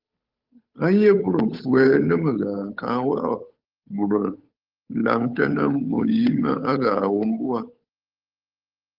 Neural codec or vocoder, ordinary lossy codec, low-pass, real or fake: codec, 16 kHz, 8 kbps, FunCodec, trained on Chinese and English, 25 frames a second; Opus, 32 kbps; 5.4 kHz; fake